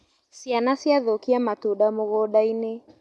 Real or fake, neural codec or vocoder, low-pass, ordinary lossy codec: real; none; none; none